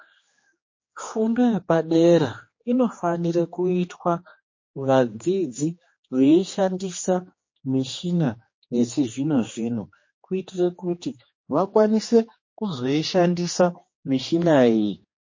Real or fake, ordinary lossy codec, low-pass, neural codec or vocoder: fake; MP3, 32 kbps; 7.2 kHz; codec, 16 kHz, 2 kbps, X-Codec, HuBERT features, trained on general audio